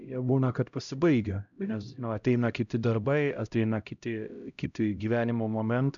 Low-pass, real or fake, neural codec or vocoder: 7.2 kHz; fake; codec, 16 kHz, 0.5 kbps, X-Codec, HuBERT features, trained on LibriSpeech